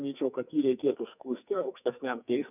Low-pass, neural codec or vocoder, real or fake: 3.6 kHz; codec, 44.1 kHz, 2.6 kbps, SNAC; fake